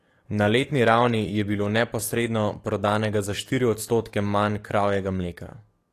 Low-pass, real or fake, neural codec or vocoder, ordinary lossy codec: 14.4 kHz; fake; codec, 44.1 kHz, 7.8 kbps, DAC; AAC, 48 kbps